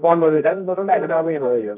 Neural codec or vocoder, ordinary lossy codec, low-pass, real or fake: codec, 24 kHz, 0.9 kbps, WavTokenizer, medium music audio release; none; 3.6 kHz; fake